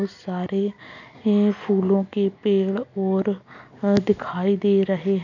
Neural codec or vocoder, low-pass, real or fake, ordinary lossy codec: none; 7.2 kHz; real; none